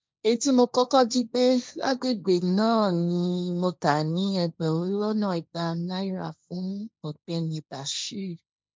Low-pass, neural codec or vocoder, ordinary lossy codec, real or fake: none; codec, 16 kHz, 1.1 kbps, Voila-Tokenizer; none; fake